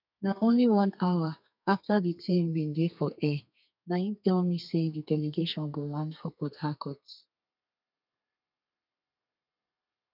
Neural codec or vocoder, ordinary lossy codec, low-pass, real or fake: codec, 32 kHz, 1.9 kbps, SNAC; none; 5.4 kHz; fake